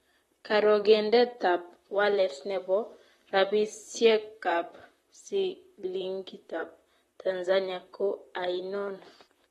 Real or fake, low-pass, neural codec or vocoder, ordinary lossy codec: fake; 19.8 kHz; vocoder, 44.1 kHz, 128 mel bands, Pupu-Vocoder; AAC, 32 kbps